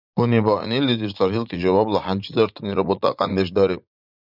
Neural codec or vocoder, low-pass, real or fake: vocoder, 44.1 kHz, 80 mel bands, Vocos; 5.4 kHz; fake